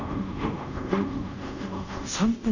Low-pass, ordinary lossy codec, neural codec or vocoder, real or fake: 7.2 kHz; none; codec, 24 kHz, 0.5 kbps, DualCodec; fake